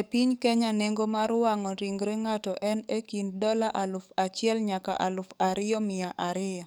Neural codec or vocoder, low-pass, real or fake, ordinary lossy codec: codec, 44.1 kHz, 7.8 kbps, DAC; none; fake; none